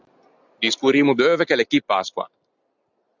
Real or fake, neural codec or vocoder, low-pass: real; none; 7.2 kHz